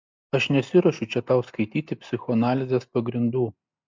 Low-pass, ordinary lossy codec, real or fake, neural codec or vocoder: 7.2 kHz; MP3, 64 kbps; real; none